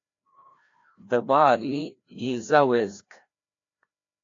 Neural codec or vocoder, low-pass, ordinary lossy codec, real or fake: codec, 16 kHz, 1 kbps, FreqCodec, larger model; 7.2 kHz; AAC, 48 kbps; fake